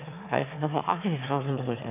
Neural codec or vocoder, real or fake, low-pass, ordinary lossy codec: autoencoder, 22.05 kHz, a latent of 192 numbers a frame, VITS, trained on one speaker; fake; 3.6 kHz; none